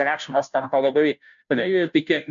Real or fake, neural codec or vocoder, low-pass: fake; codec, 16 kHz, 0.5 kbps, FunCodec, trained on Chinese and English, 25 frames a second; 7.2 kHz